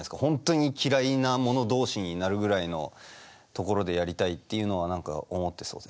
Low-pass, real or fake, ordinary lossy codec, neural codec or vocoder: none; real; none; none